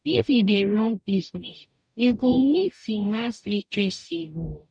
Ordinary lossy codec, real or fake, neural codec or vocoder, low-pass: none; fake; codec, 44.1 kHz, 0.9 kbps, DAC; 9.9 kHz